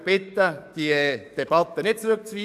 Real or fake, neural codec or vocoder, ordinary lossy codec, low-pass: fake; codec, 44.1 kHz, 7.8 kbps, DAC; none; 14.4 kHz